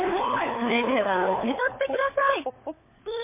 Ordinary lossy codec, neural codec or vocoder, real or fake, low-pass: MP3, 24 kbps; codec, 16 kHz, 4 kbps, FunCodec, trained on LibriTTS, 50 frames a second; fake; 3.6 kHz